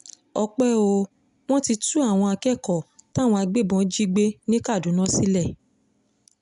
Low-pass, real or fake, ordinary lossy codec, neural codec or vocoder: 10.8 kHz; real; none; none